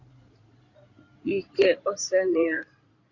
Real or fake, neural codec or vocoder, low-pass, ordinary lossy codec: fake; codec, 16 kHz in and 24 kHz out, 2.2 kbps, FireRedTTS-2 codec; 7.2 kHz; Opus, 64 kbps